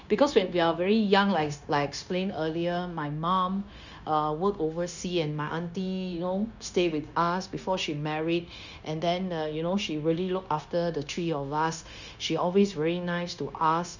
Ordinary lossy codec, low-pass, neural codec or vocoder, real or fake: none; 7.2 kHz; codec, 16 kHz, 0.9 kbps, LongCat-Audio-Codec; fake